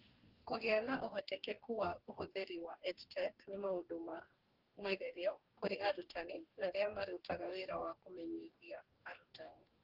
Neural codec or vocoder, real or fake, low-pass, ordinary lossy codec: codec, 44.1 kHz, 2.6 kbps, DAC; fake; 5.4 kHz; Opus, 16 kbps